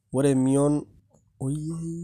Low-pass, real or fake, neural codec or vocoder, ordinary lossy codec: 14.4 kHz; real; none; none